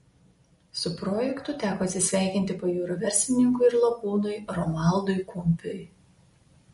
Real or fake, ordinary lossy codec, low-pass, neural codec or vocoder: real; MP3, 48 kbps; 19.8 kHz; none